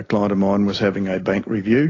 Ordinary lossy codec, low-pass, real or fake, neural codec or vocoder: AAC, 32 kbps; 7.2 kHz; real; none